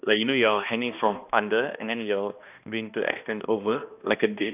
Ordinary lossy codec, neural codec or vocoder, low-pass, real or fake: none; codec, 16 kHz, 1 kbps, X-Codec, HuBERT features, trained on balanced general audio; 3.6 kHz; fake